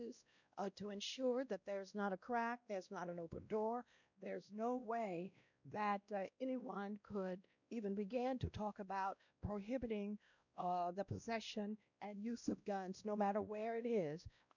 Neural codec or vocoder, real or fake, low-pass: codec, 16 kHz, 1 kbps, X-Codec, WavLM features, trained on Multilingual LibriSpeech; fake; 7.2 kHz